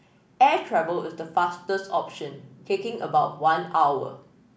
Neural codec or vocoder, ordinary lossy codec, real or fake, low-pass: none; none; real; none